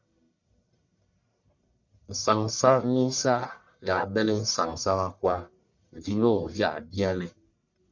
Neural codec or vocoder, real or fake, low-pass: codec, 44.1 kHz, 1.7 kbps, Pupu-Codec; fake; 7.2 kHz